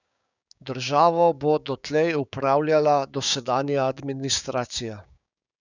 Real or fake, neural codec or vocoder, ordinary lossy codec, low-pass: fake; codec, 16 kHz, 6 kbps, DAC; none; 7.2 kHz